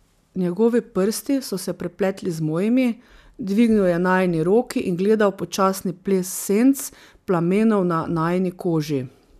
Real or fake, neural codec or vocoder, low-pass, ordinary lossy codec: real; none; 14.4 kHz; none